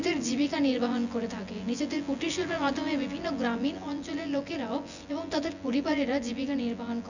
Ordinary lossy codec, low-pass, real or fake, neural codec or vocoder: none; 7.2 kHz; fake; vocoder, 24 kHz, 100 mel bands, Vocos